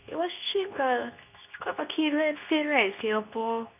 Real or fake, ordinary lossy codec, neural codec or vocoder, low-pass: fake; none; codec, 24 kHz, 0.9 kbps, WavTokenizer, medium speech release version 2; 3.6 kHz